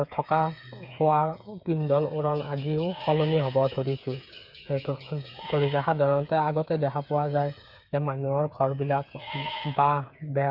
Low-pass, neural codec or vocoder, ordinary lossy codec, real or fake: 5.4 kHz; codec, 16 kHz, 8 kbps, FreqCodec, smaller model; none; fake